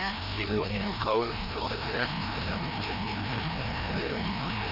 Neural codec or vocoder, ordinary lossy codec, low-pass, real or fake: codec, 16 kHz, 1 kbps, FreqCodec, larger model; none; 5.4 kHz; fake